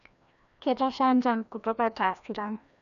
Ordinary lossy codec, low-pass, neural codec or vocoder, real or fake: none; 7.2 kHz; codec, 16 kHz, 1 kbps, FreqCodec, larger model; fake